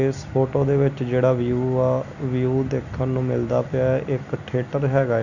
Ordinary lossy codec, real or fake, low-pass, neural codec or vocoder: none; real; 7.2 kHz; none